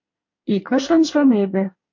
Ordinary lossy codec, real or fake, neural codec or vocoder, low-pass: MP3, 48 kbps; fake; codec, 24 kHz, 1 kbps, SNAC; 7.2 kHz